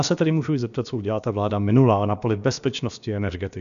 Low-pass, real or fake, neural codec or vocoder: 7.2 kHz; fake; codec, 16 kHz, about 1 kbps, DyCAST, with the encoder's durations